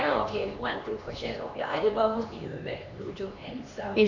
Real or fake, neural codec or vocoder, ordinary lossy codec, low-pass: fake; codec, 16 kHz, 2 kbps, X-Codec, HuBERT features, trained on LibriSpeech; none; 7.2 kHz